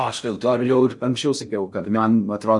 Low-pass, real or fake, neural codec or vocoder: 10.8 kHz; fake; codec, 16 kHz in and 24 kHz out, 0.6 kbps, FocalCodec, streaming, 4096 codes